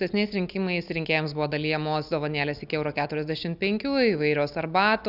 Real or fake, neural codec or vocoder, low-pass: real; none; 5.4 kHz